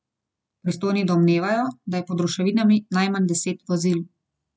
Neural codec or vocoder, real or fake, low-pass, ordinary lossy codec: none; real; none; none